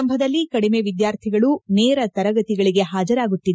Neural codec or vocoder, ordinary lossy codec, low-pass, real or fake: none; none; none; real